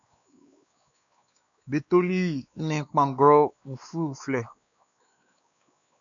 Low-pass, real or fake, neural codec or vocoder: 7.2 kHz; fake; codec, 16 kHz, 2 kbps, X-Codec, WavLM features, trained on Multilingual LibriSpeech